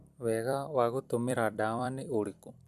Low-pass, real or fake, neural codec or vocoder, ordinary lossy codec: 14.4 kHz; fake; vocoder, 44.1 kHz, 128 mel bands every 256 samples, BigVGAN v2; MP3, 96 kbps